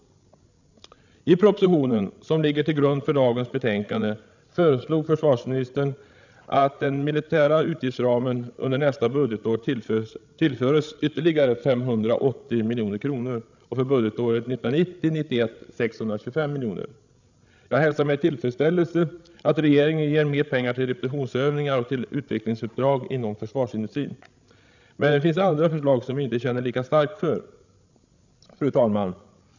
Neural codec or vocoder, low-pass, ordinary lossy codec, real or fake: codec, 16 kHz, 16 kbps, FreqCodec, larger model; 7.2 kHz; none; fake